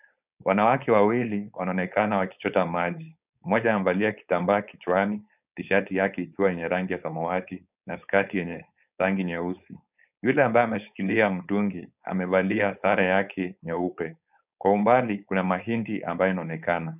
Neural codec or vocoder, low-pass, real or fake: codec, 16 kHz, 4.8 kbps, FACodec; 3.6 kHz; fake